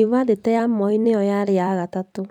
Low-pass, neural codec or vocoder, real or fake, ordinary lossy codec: 19.8 kHz; vocoder, 44.1 kHz, 128 mel bands every 256 samples, BigVGAN v2; fake; none